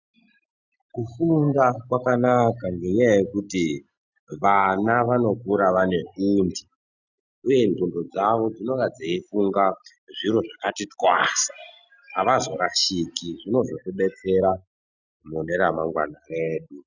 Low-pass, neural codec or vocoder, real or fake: 7.2 kHz; none; real